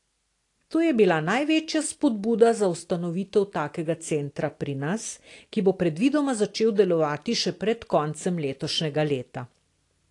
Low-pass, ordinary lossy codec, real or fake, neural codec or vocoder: 10.8 kHz; AAC, 48 kbps; real; none